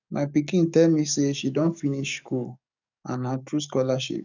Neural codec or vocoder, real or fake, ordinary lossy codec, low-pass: codec, 44.1 kHz, 7.8 kbps, DAC; fake; none; 7.2 kHz